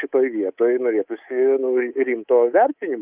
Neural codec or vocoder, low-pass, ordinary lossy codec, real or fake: codec, 24 kHz, 3.1 kbps, DualCodec; 3.6 kHz; Opus, 32 kbps; fake